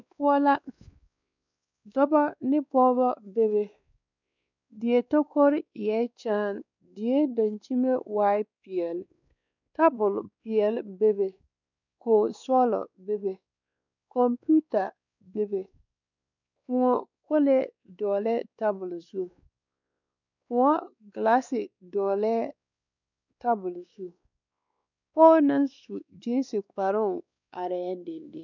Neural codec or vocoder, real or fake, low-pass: codec, 16 kHz, 2 kbps, X-Codec, WavLM features, trained on Multilingual LibriSpeech; fake; 7.2 kHz